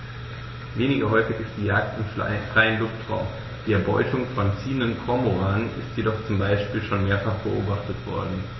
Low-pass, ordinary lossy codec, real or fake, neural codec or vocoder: 7.2 kHz; MP3, 24 kbps; real; none